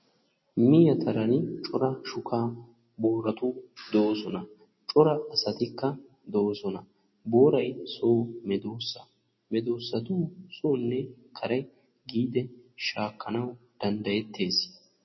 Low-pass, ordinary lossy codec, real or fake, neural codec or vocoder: 7.2 kHz; MP3, 24 kbps; real; none